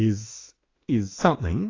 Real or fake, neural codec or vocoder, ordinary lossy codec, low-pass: fake; autoencoder, 48 kHz, 32 numbers a frame, DAC-VAE, trained on Japanese speech; AAC, 32 kbps; 7.2 kHz